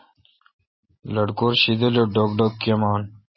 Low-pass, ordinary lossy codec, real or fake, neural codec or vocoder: 7.2 kHz; MP3, 24 kbps; real; none